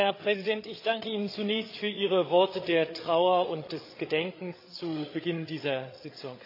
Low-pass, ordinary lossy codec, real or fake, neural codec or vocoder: 5.4 kHz; AAC, 32 kbps; fake; codec, 16 kHz, 8 kbps, FreqCodec, larger model